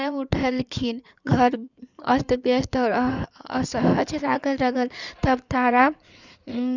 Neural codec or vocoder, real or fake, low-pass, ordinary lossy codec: codec, 16 kHz in and 24 kHz out, 2.2 kbps, FireRedTTS-2 codec; fake; 7.2 kHz; none